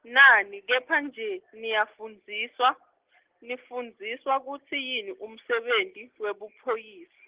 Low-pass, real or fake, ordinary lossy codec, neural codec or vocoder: 3.6 kHz; real; Opus, 16 kbps; none